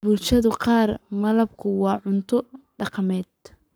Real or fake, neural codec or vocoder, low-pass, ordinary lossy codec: fake; codec, 44.1 kHz, 7.8 kbps, Pupu-Codec; none; none